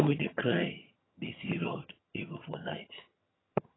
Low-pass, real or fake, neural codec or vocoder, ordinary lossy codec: 7.2 kHz; fake; vocoder, 22.05 kHz, 80 mel bands, HiFi-GAN; AAC, 16 kbps